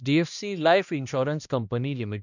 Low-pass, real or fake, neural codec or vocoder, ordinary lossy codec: 7.2 kHz; fake; codec, 16 kHz, 1 kbps, X-Codec, HuBERT features, trained on balanced general audio; none